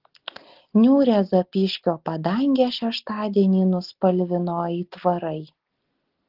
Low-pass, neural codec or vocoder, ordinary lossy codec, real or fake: 5.4 kHz; none; Opus, 16 kbps; real